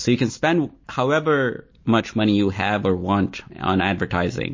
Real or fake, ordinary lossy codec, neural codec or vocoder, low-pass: real; MP3, 32 kbps; none; 7.2 kHz